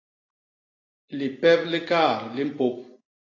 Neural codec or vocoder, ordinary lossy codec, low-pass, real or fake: none; AAC, 32 kbps; 7.2 kHz; real